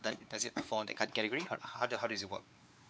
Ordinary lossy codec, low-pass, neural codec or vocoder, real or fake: none; none; codec, 16 kHz, 4 kbps, X-Codec, WavLM features, trained on Multilingual LibriSpeech; fake